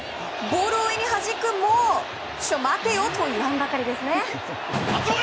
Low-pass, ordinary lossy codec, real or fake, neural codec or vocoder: none; none; real; none